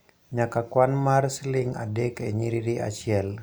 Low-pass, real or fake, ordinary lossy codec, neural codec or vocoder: none; real; none; none